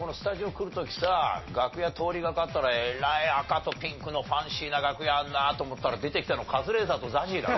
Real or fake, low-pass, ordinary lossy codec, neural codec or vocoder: real; 7.2 kHz; MP3, 24 kbps; none